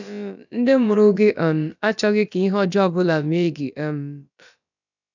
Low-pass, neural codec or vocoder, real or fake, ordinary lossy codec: 7.2 kHz; codec, 16 kHz, about 1 kbps, DyCAST, with the encoder's durations; fake; none